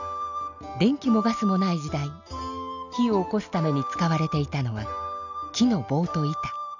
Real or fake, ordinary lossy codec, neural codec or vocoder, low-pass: real; none; none; 7.2 kHz